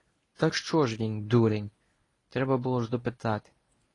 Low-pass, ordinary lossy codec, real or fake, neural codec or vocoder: 10.8 kHz; AAC, 32 kbps; fake; codec, 24 kHz, 0.9 kbps, WavTokenizer, medium speech release version 1